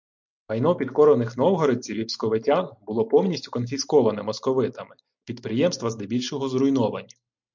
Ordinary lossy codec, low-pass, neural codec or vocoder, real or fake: MP3, 64 kbps; 7.2 kHz; none; real